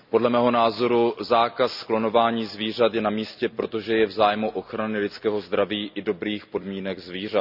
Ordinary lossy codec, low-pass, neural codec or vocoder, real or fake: none; 5.4 kHz; none; real